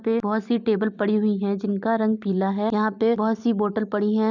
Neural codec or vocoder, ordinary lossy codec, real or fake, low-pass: none; none; real; 7.2 kHz